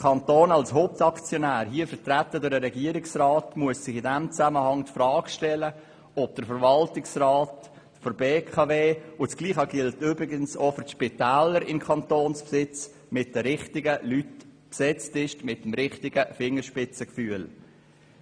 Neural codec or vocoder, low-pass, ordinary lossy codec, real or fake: none; 9.9 kHz; none; real